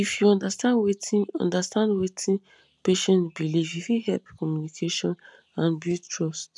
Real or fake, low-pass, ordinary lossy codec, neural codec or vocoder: real; none; none; none